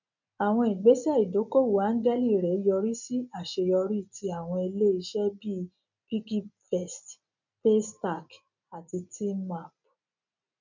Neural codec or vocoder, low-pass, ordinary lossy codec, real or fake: none; 7.2 kHz; none; real